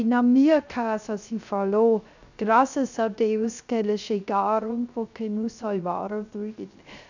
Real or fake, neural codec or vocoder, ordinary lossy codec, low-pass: fake; codec, 16 kHz, 0.3 kbps, FocalCodec; none; 7.2 kHz